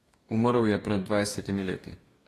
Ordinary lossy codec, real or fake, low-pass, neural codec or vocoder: AAC, 48 kbps; fake; 14.4 kHz; codec, 44.1 kHz, 2.6 kbps, DAC